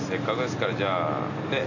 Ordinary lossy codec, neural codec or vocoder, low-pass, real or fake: none; none; 7.2 kHz; real